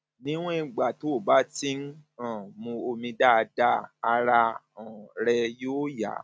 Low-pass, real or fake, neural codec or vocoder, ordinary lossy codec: none; real; none; none